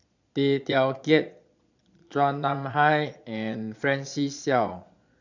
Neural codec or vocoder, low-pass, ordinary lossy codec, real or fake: vocoder, 22.05 kHz, 80 mel bands, Vocos; 7.2 kHz; none; fake